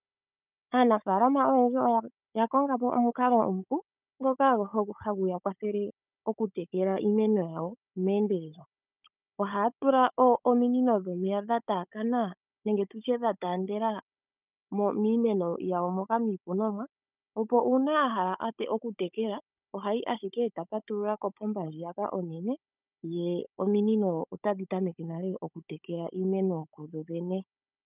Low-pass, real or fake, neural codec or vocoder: 3.6 kHz; fake; codec, 16 kHz, 16 kbps, FunCodec, trained on Chinese and English, 50 frames a second